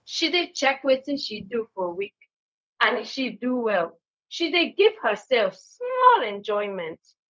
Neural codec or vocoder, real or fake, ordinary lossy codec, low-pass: codec, 16 kHz, 0.4 kbps, LongCat-Audio-Codec; fake; none; none